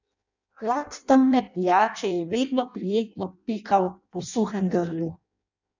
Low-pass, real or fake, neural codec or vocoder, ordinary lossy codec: 7.2 kHz; fake; codec, 16 kHz in and 24 kHz out, 0.6 kbps, FireRedTTS-2 codec; none